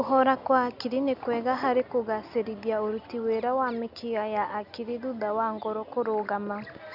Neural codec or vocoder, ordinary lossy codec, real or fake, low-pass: none; none; real; 5.4 kHz